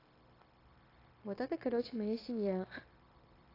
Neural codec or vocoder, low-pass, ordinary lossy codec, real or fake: codec, 16 kHz, 0.9 kbps, LongCat-Audio-Codec; 5.4 kHz; AAC, 24 kbps; fake